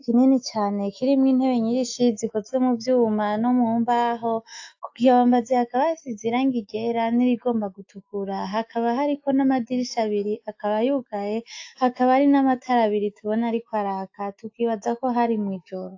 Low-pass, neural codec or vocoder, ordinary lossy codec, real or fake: 7.2 kHz; autoencoder, 48 kHz, 128 numbers a frame, DAC-VAE, trained on Japanese speech; AAC, 48 kbps; fake